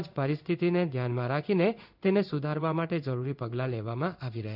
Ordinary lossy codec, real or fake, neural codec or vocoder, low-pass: none; fake; codec, 16 kHz in and 24 kHz out, 1 kbps, XY-Tokenizer; 5.4 kHz